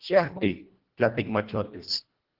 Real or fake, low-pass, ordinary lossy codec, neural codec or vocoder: fake; 5.4 kHz; Opus, 16 kbps; codec, 24 kHz, 1.5 kbps, HILCodec